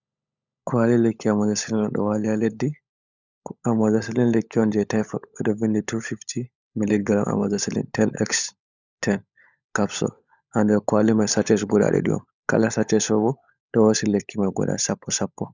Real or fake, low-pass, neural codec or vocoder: fake; 7.2 kHz; codec, 16 kHz, 16 kbps, FunCodec, trained on LibriTTS, 50 frames a second